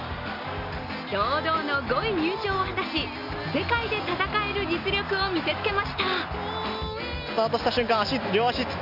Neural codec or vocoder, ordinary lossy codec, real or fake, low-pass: none; AAC, 48 kbps; real; 5.4 kHz